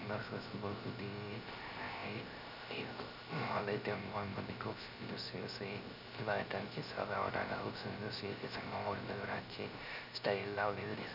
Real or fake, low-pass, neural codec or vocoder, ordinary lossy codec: fake; 5.4 kHz; codec, 16 kHz, 0.3 kbps, FocalCodec; none